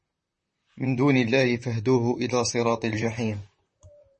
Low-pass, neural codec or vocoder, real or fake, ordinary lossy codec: 10.8 kHz; vocoder, 44.1 kHz, 128 mel bands, Pupu-Vocoder; fake; MP3, 32 kbps